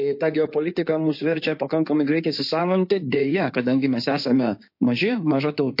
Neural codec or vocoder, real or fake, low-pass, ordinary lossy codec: codec, 16 kHz in and 24 kHz out, 2.2 kbps, FireRedTTS-2 codec; fake; 5.4 kHz; MP3, 32 kbps